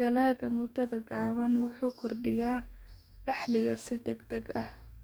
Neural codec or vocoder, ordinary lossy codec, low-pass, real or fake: codec, 44.1 kHz, 2.6 kbps, DAC; none; none; fake